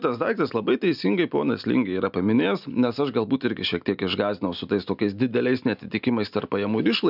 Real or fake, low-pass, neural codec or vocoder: real; 5.4 kHz; none